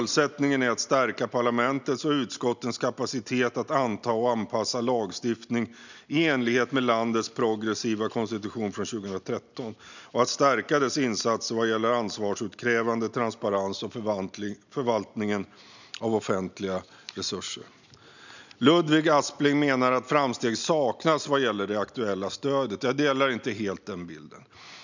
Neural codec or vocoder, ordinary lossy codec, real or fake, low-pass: none; none; real; 7.2 kHz